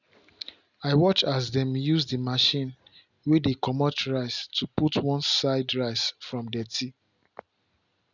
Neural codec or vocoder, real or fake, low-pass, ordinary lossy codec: none; real; 7.2 kHz; none